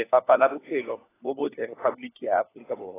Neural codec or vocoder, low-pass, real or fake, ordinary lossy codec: codec, 16 kHz, 4 kbps, FunCodec, trained on LibriTTS, 50 frames a second; 3.6 kHz; fake; AAC, 16 kbps